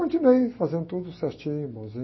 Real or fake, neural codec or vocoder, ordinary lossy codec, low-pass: real; none; MP3, 24 kbps; 7.2 kHz